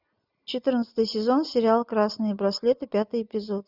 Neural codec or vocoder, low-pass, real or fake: none; 5.4 kHz; real